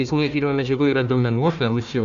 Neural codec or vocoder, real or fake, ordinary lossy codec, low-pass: codec, 16 kHz, 1 kbps, FunCodec, trained on Chinese and English, 50 frames a second; fake; MP3, 64 kbps; 7.2 kHz